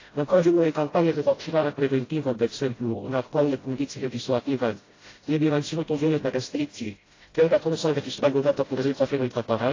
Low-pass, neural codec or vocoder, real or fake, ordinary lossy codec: 7.2 kHz; codec, 16 kHz, 0.5 kbps, FreqCodec, smaller model; fake; AAC, 32 kbps